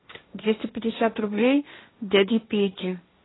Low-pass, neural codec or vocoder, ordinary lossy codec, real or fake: 7.2 kHz; codec, 16 kHz, 1.1 kbps, Voila-Tokenizer; AAC, 16 kbps; fake